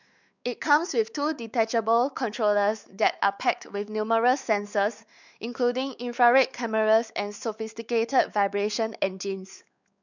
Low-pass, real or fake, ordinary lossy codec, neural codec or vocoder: 7.2 kHz; fake; none; codec, 16 kHz, 4 kbps, X-Codec, WavLM features, trained on Multilingual LibriSpeech